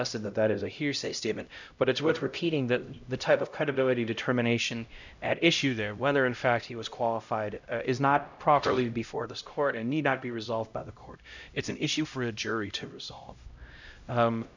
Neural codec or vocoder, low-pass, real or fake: codec, 16 kHz, 0.5 kbps, X-Codec, HuBERT features, trained on LibriSpeech; 7.2 kHz; fake